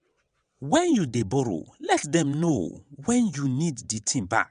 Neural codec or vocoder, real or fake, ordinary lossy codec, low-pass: vocoder, 22.05 kHz, 80 mel bands, WaveNeXt; fake; none; 9.9 kHz